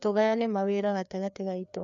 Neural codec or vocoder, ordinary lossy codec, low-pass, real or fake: codec, 16 kHz, 2 kbps, FreqCodec, larger model; none; 7.2 kHz; fake